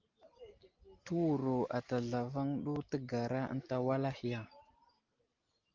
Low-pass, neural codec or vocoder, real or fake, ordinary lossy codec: 7.2 kHz; none; real; Opus, 32 kbps